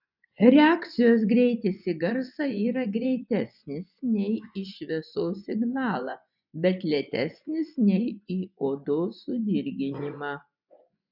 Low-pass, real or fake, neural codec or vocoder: 5.4 kHz; fake; vocoder, 24 kHz, 100 mel bands, Vocos